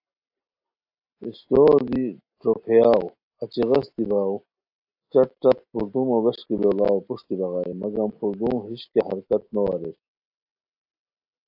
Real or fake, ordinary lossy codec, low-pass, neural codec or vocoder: real; AAC, 48 kbps; 5.4 kHz; none